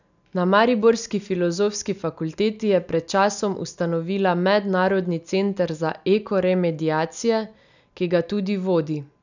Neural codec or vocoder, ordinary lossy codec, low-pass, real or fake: none; none; 7.2 kHz; real